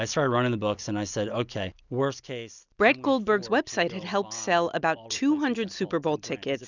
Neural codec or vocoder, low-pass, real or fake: none; 7.2 kHz; real